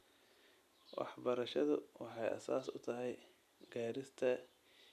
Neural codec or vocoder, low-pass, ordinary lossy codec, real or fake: none; 14.4 kHz; none; real